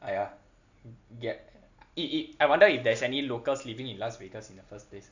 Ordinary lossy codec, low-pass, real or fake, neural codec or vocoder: none; 7.2 kHz; real; none